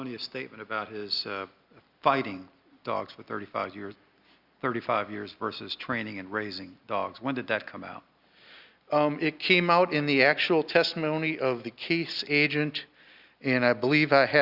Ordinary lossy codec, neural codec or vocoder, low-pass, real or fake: Opus, 64 kbps; none; 5.4 kHz; real